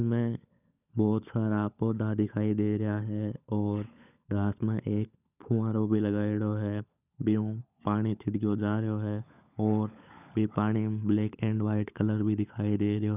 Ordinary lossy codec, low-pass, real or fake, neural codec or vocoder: none; 3.6 kHz; fake; codec, 16 kHz, 16 kbps, FunCodec, trained on LibriTTS, 50 frames a second